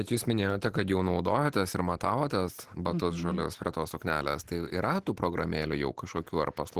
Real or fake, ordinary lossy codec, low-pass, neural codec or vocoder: real; Opus, 16 kbps; 14.4 kHz; none